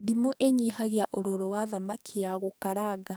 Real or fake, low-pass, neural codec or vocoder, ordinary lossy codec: fake; none; codec, 44.1 kHz, 2.6 kbps, SNAC; none